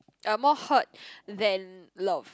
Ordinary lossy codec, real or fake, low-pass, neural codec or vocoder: none; real; none; none